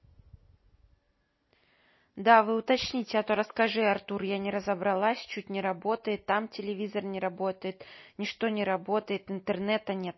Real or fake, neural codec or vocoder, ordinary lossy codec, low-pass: real; none; MP3, 24 kbps; 7.2 kHz